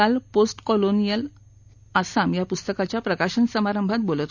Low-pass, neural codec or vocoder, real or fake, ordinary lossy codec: 7.2 kHz; none; real; none